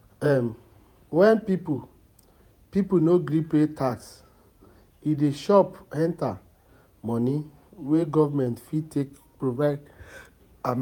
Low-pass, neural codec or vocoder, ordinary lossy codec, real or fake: 19.8 kHz; vocoder, 48 kHz, 128 mel bands, Vocos; none; fake